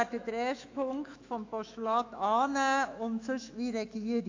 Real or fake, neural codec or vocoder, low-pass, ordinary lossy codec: fake; autoencoder, 48 kHz, 128 numbers a frame, DAC-VAE, trained on Japanese speech; 7.2 kHz; AAC, 48 kbps